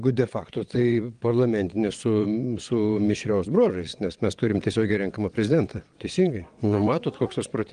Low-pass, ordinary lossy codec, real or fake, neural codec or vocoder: 9.9 kHz; Opus, 24 kbps; fake; vocoder, 22.05 kHz, 80 mel bands, WaveNeXt